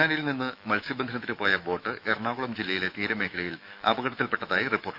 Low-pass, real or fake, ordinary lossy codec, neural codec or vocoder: 5.4 kHz; fake; none; autoencoder, 48 kHz, 128 numbers a frame, DAC-VAE, trained on Japanese speech